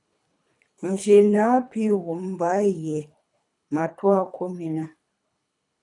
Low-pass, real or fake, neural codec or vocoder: 10.8 kHz; fake; codec, 24 kHz, 3 kbps, HILCodec